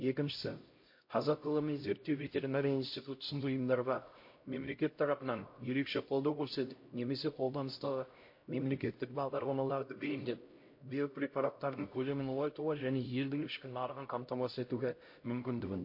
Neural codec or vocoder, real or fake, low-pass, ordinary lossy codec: codec, 16 kHz, 0.5 kbps, X-Codec, HuBERT features, trained on LibriSpeech; fake; 5.4 kHz; MP3, 32 kbps